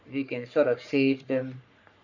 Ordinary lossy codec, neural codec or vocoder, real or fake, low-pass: none; codec, 44.1 kHz, 3.4 kbps, Pupu-Codec; fake; 7.2 kHz